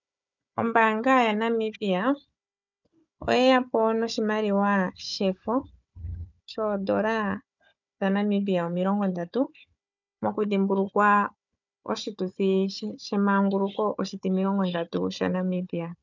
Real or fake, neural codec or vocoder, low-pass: fake; codec, 16 kHz, 4 kbps, FunCodec, trained on Chinese and English, 50 frames a second; 7.2 kHz